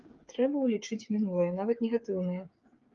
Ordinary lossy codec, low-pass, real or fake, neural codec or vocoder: Opus, 32 kbps; 7.2 kHz; fake; codec, 16 kHz, 8 kbps, FreqCodec, smaller model